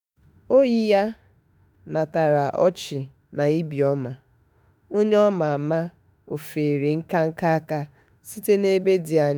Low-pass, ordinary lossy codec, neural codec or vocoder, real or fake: none; none; autoencoder, 48 kHz, 32 numbers a frame, DAC-VAE, trained on Japanese speech; fake